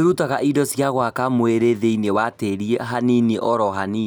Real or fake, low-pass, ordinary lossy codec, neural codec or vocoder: real; none; none; none